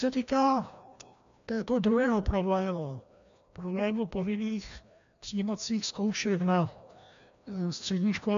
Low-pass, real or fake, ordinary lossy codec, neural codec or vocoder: 7.2 kHz; fake; MP3, 64 kbps; codec, 16 kHz, 1 kbps, FreqCodec, larger model